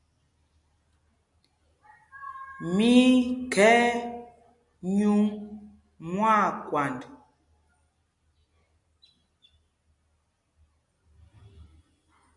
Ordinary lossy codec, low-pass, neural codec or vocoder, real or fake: MP3, 96 kbps; 10.8 kHz; none; real